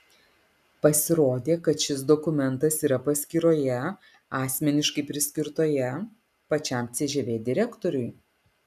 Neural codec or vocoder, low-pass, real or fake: none; 14.4 kHz; real